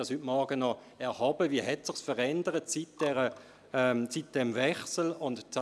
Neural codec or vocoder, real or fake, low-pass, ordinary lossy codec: none; real; none; none